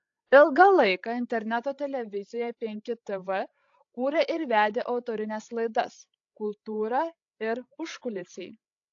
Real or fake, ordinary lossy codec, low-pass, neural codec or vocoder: fake; AAC, 48 kbps; 7.2 kHz; codec, 16 kHz, 16 kbps, FreqCodec, larger model